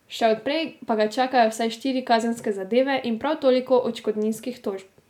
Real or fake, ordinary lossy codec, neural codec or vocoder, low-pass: real; none; none; 19.8 kHz